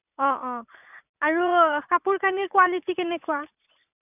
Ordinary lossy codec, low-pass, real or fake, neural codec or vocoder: none; 3.6 kHz; fake; vocoder, 44.1 kHz, 80 mel bands, Vocos